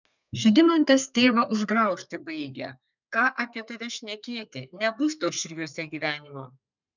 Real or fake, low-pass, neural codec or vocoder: fake; 7.2 kHz; codec, 32 kHz, 1.9 kbps, SNAC